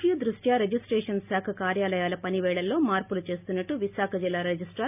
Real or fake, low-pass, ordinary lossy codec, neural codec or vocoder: real; 3.6 kHz; none; none